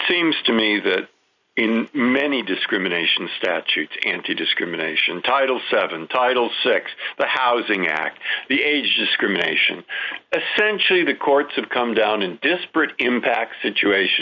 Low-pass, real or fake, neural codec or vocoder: 7.2 kHz; real; none